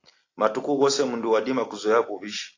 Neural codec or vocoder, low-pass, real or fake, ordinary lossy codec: none; 7.2 kHz; real; AAC, 32 kbps